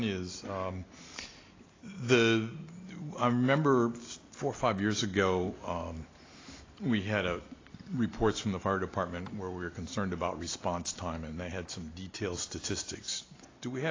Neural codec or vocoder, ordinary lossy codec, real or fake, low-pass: none; AAC, 32 kbps; real; 7.2 kHz